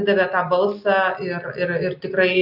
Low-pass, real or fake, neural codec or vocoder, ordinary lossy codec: 5.4 kHz; real; none; AAC, 48 kbps